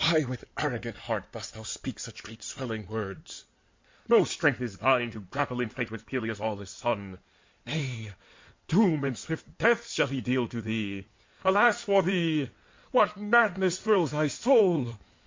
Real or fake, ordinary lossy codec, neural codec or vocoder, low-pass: fake; MP3, 48 kbps; codec, 16 kHz in and 24 kHz out, 2.2 kbps, FireRedTTS-2 codec; 7.2 kHz